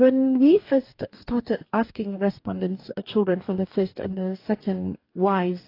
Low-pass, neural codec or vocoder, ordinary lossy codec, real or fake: 5.4 kHz; codec, 44.1 kHz, 2.6 kbps, DAC; AAC, 32 kbps; fake